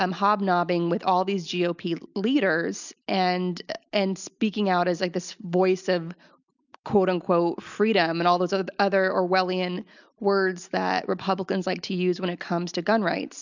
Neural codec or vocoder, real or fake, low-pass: none; real; 7.2 kHz